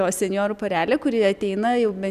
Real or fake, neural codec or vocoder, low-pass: real; none; 14.4 kHz